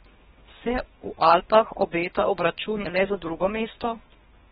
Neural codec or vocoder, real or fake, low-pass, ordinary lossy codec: codec, 24 kHz, 3 kbps, HILCodec; fake; 10.8 kHz; AAC, 16 kbps